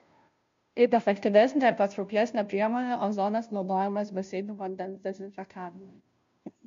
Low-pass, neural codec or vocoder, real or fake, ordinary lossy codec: 7.2 kHz; codec, 16 kHz, 0.5 kbps, FunCodec, trained on Chinese and English, 25 frames a second; fake; MP3, 48 kbps